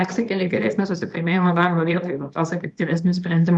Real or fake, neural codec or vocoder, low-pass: fake; codec, 24 kHz, 0.9 kbps, WavTokenizer, small release; 10.8 kHz